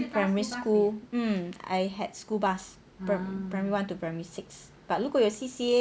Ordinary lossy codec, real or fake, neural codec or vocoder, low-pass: none; real; none; none